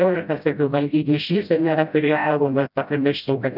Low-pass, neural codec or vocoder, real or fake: 5.4 kHz; codec, 16 kHz, 0.5 kbps, FreqCodec, smaller model; fake